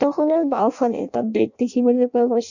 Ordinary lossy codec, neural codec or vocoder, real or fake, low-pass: none; codec, 16 kHz in and 24 kHz out, 0.6 kbps, FireRedTTS-2 codec; fake; 7.2 kHz